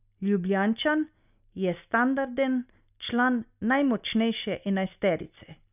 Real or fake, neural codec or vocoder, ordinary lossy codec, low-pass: real; none; none; 3.6 kHz